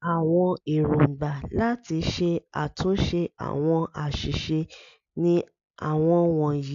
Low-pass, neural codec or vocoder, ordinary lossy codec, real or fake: 7.2 kHz; none; AAC, 64 kbps; real